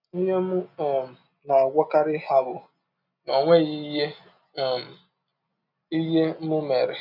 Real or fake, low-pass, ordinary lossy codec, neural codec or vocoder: real; 5.4 kHz; none; none